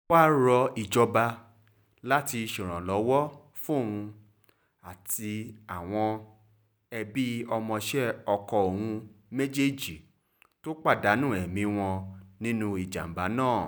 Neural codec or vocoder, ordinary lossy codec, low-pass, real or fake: none; none; none; real